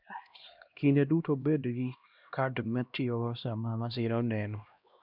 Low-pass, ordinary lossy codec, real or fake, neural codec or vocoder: 5.4 kHz; none; fake; codec, 16 kHz, 1 kbps, X-Codec, HuBERT features, trained on LibriSpeech